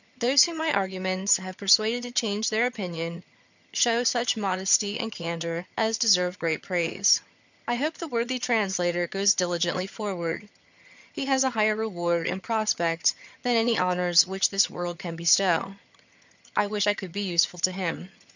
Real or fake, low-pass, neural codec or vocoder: fake; 7.2 kHz; vocoder, 22.05 kHz, 80 mel bands, HiFi-GAN